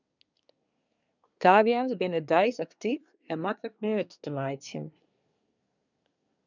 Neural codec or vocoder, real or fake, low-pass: codec, 24 kHz, 1 kbps, SNAC; fake; 7.2 kHz